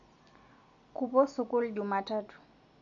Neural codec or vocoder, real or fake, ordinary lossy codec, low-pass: none; real; none; 7.2 kHz